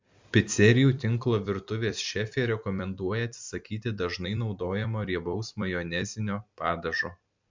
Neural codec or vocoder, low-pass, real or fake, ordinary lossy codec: vocoder, 44.1 kHz, 128 mel bands every 256 samples, BigVGAN v2; 7.2 kHz; fake; MP3, 64 kbps